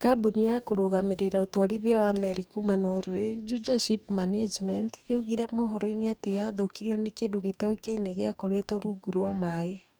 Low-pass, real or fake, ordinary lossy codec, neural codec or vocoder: none; fake; none; codec, 44.1 kHz, 2.6 kbps, DAC